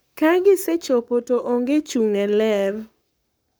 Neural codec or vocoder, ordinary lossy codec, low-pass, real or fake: codec, 44.1 kHz, 7.8 kbps, Pupu-Codec; none; none; fake